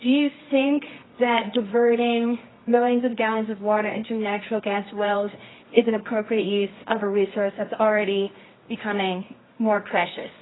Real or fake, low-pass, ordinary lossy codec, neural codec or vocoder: fake; 7.2 kHz; AAC, 16 kbps; codec, 24 kHz, 0.9 kbps, WavTokenizer, medium music audio release